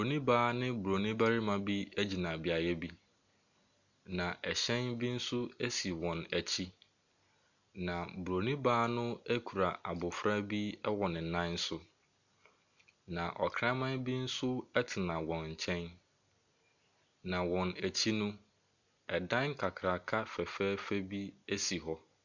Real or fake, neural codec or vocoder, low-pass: real; none; 7.2 kHz